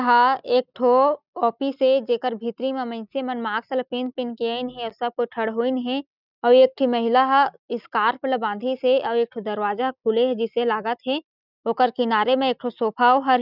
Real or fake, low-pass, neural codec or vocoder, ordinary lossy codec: real; 5.4 kHz; none; none